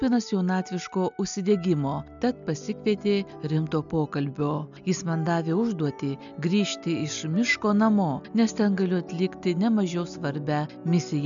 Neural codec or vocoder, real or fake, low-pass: none; real; 7.2 kHz